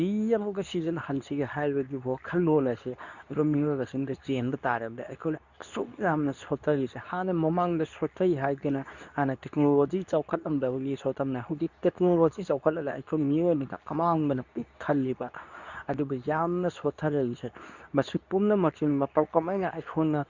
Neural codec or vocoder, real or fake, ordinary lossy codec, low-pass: codec, 24 kHz, 0.9 kbps, WavTokenizer, medium speech release version 2; fake; none; 7.2 kHz